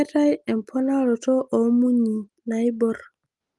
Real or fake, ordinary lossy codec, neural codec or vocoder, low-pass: real; Opus, 24 kbps; none; 10.8 kHz